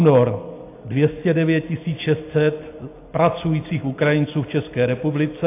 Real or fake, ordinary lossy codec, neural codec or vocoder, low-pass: real; AAC, 24 kbps; none; 3.6 kHz